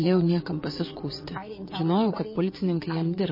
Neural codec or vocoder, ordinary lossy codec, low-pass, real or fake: vocoder, 22.05 kHz, 80 mel bands, WaveNeXt; MP3, 24 kbps; 5.4 kHz; fake